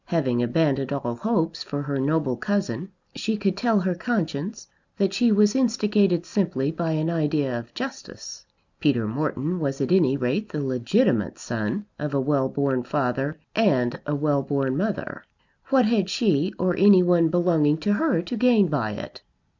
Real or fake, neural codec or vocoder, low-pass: real; none; 7.2 kHz